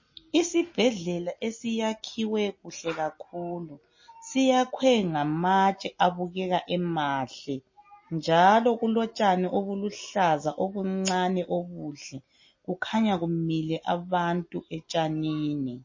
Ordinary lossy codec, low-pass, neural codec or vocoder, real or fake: MP3, 32 kbps; 7.2 kHz; none; real